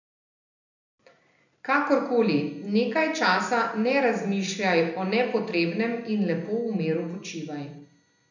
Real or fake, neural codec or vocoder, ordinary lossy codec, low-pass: real; none; none; 7.2 kHz